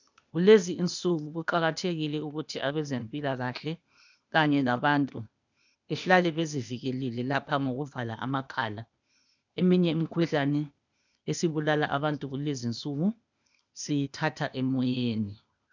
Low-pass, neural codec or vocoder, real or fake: 7.2 kHz; codec, 16 kHz, 0.8 kbps, ZipCodec; fake